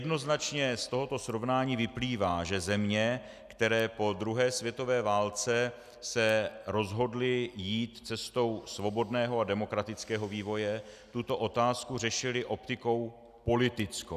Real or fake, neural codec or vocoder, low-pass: real; none; 14.4 kHz